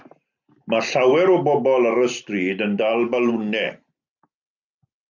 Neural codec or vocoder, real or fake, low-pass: none; real; 7.2 kHz